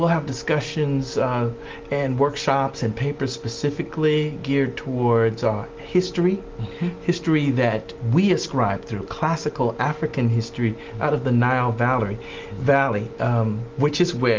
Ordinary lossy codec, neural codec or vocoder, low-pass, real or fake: Opus, 32 kbps; none; 7.2 kHz; real